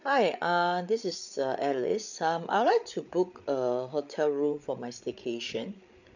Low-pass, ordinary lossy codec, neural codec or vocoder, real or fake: 7.2 kHz; none; codec, 16 kHz, 8 kbps, FreqCodec, larger model; fake